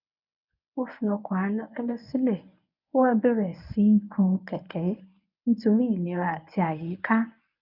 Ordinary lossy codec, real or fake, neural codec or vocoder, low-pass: none; fake; codec, 24 kHz, 0.9 kbps, WavTokenizer, medium speech release version 1; 5.4 kHz